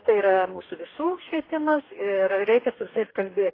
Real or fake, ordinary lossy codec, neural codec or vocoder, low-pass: fake; AAC, 24 kbps; codec, 44.1 kHz, 2.6 kbps, DAC; 5.4 kHz